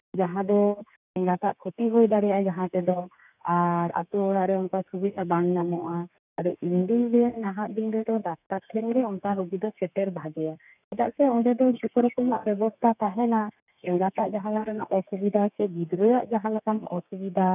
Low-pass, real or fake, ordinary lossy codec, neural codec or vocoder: 3.6 kHz; fake; none; codec, 32 kHz, 1.9 kbps, SNAC